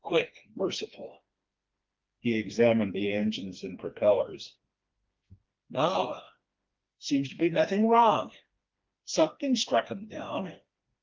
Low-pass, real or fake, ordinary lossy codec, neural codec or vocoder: 7.2 kHz; fake; Opus, 32 kbps; codec, 16 kHz, 2 kbps, FreqCodec, smaller model